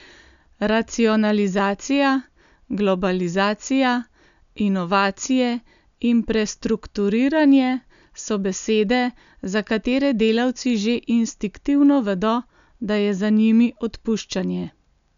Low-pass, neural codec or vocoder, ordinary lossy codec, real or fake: 7.2 kHz; none; none; real